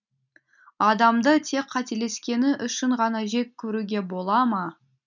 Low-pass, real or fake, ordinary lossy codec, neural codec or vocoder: 7.2 kHz; real; none; none